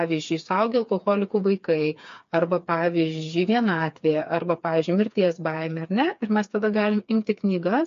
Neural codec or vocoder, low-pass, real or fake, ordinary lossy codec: codec, 16 kHz, 4 kbps, FreqCodec, smaller model; 7.2 kHz; fake; MP3, 48 kbps